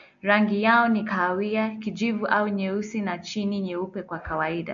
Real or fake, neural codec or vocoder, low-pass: real; none; 7.2 kHz